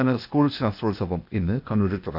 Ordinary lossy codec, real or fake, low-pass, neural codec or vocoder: none; fake; 5.4 kHz; codec, 16 kHz, 0.8 kbps, ZipCodec